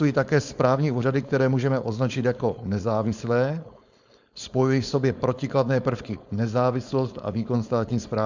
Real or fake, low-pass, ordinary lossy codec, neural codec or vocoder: fake; 7.2 kHz; Opus, 64 kbps; codec, 16 kHz, 4.8 kbps, FACodec